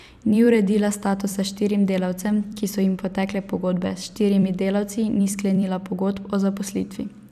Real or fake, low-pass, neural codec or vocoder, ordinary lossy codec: fake; 14.4 kHz; vocoder, 44.1 kHz, 128 mel bands every 256 samples, BigVGAN v2; none